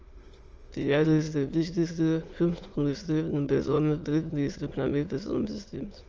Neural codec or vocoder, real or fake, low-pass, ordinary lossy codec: autoencoder, 22.05 kHz, a latent of 192 numbers a frame, VITS, trained on many speakers; fake; 7.2 kHz; Opus, 24 kbps